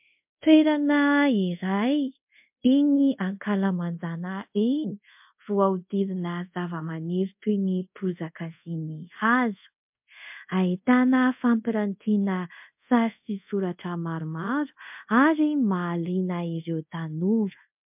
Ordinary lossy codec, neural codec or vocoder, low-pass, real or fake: MP3, 32 kbps; codec, 24 kHz, 0.5 kbps, DualCodec; 3.6 kHz; fake